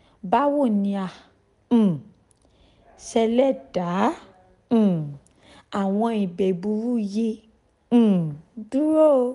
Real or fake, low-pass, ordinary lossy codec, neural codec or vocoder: real; 10.8 kHz; none; none